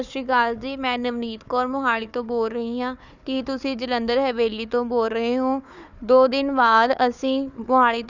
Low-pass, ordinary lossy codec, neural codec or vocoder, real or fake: 7.2 kHz; none; codec, 16 kHz, 4 kbps, FunCodec, trained on Chinese and English, 50 frames a second; fake